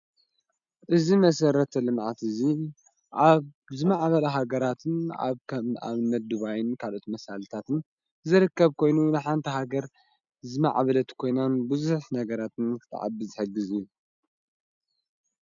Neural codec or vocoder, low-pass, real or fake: none; 7.2 kHz; real